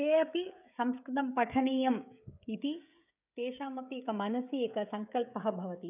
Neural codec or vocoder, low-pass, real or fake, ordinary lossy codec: codec, 16 kHz, 16 kbps, FreqCodec, smaller model; 3.6 kHz; fake; none